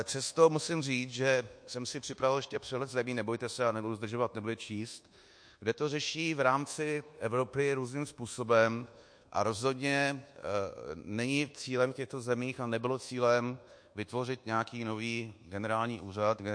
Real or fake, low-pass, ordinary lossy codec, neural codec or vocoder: fake; 9.9 kHz; MP3, 48 kbps; codec, 24 kHz, 1.2 kbps, DualCodec